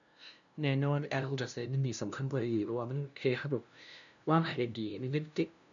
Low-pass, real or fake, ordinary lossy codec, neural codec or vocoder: 7.2 kHz; fake; none; codec, 16 kHz, 0.5 kbps, FunCodec, trained on LibriTTS, 25 frames a second